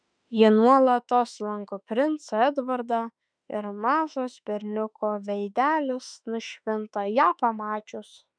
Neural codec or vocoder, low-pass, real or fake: autoencoder, 48 kHz, 32 numbers a frame, DAC-VAE, trained on Japanese speech; 9.9 kHz; fake